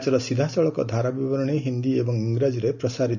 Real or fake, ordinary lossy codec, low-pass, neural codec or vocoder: real; none; 7.2 kHz; none